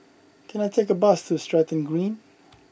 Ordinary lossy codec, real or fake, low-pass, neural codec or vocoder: none; real; none; none